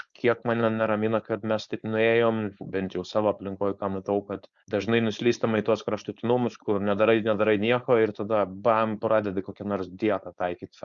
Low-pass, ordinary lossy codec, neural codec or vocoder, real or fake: 7.2 kHz; Opus, 64 kbps; codec, 16 kHz, 4.8 kbps, FACodec; fake